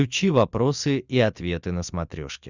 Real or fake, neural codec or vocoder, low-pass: real; none; 7.2 kHz